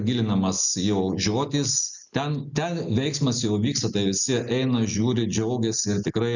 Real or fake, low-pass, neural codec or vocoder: real; 7.2 kHz; none